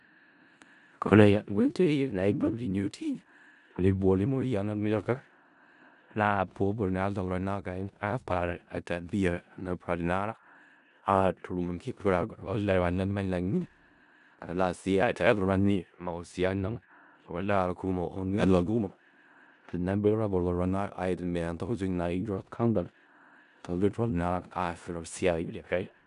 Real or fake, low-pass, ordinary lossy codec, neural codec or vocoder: fake; 10.8 kHz; none; codec, 16 kHz in and 24 kHz out, 0.4 kbps, LongCat-Audio-Codec, four codebook decoder